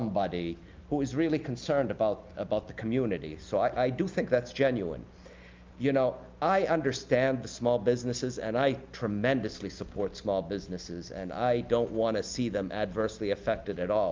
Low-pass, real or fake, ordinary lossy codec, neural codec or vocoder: 7.2 kHz; real; Opus, 24 kbps; none